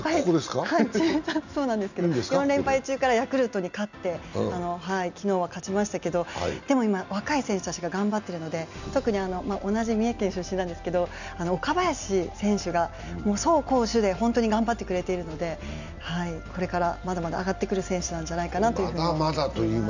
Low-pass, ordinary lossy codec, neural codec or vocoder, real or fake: 7.2 kHz; none; none; real